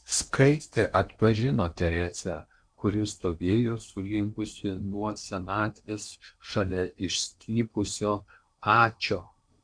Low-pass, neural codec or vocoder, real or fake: 9.9 kHz; codec, 16 kHz in and 24 kHz out, 0.8 kbps, FocalCodec, streaming, 65536 codes; fake